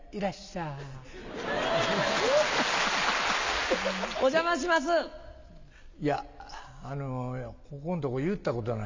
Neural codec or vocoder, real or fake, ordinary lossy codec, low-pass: none; real; MP3, 64 kbps; 7.2 kHz